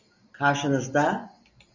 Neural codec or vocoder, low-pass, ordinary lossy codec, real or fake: none; 7.2 kHz; Opus, 64 kbps; real